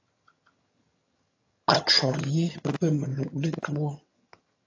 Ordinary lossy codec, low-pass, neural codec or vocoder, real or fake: AAC, 32 kbps; 7.2 kHz; vocoder, 22.05 kHz, 80 mel bands, HiFi-GAN; fake